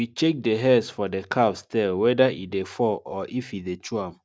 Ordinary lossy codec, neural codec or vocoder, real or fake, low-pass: none; none; real; none